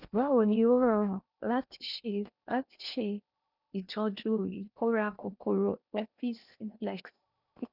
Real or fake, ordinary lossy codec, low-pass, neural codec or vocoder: fake; none; 5.4 kHz; codec, 16 kHz in and 24 kHz out, 0.8 kbps, FocalCodec, streaming, 65536 codes